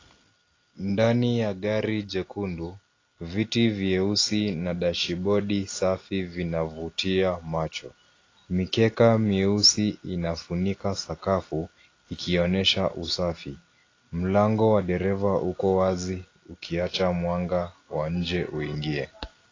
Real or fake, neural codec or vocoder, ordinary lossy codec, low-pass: real; none; AAC, 32 kbps; 7.2 kHz